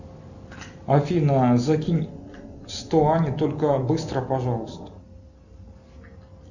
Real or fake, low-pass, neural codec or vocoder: real; 7.2 kHz; none